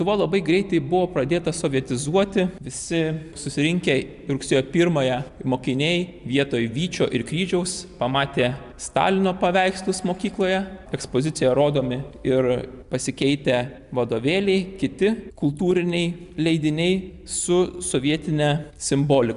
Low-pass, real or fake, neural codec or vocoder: 10.8 kHz; real; none